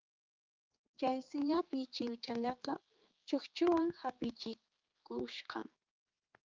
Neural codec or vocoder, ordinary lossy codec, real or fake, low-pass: codec, 16 kHz, 4 kbps, X-Codec, HuBERT features, trained on general audio; Opus, 32 kbps; fake; 7.2 kHz